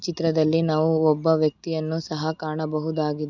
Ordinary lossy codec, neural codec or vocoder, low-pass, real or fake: none; none; 7.2 kHz; real